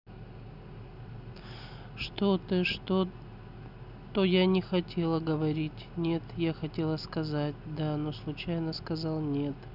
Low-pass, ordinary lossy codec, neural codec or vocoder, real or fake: 5.4 kHz; none; none; real